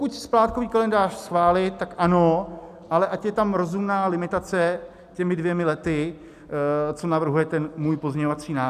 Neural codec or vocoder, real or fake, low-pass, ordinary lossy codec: none; real; 14.4 kHz; AAC, 96 kbps